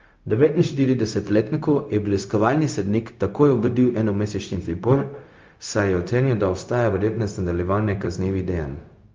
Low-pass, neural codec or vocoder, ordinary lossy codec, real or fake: 7.2 kHz; codec, 16 kHz, 0.4 kbps, LongCat-Audio-Codec; Opus, 24 kbps; fake